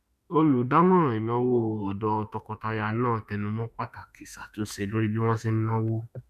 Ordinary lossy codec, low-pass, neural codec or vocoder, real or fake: none; 14.4 kHz; autoencoder, 48 kHz, 32 numbers a frame, DAC-VAE, trained on Japanese speech; fake